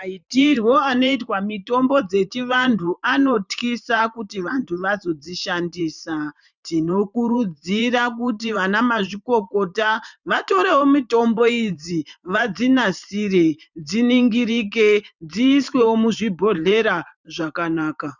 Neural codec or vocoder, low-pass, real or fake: vocoder, 44.1 kHz, 128 mel bands every 512 samples, BigVGAN v2; 7.2 kHz; fake